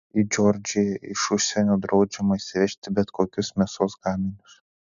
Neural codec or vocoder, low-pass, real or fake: none; 7.2 kHz; real